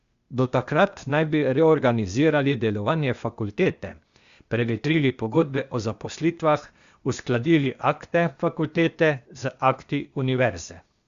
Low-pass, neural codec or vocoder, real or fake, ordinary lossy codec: 7.2 kHz; codec, 16 kHz, 0.8 kbps, ZipCodec; fake; Opus, 64 kbps